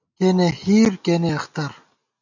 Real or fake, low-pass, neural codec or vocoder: real; 7.2 kHz; none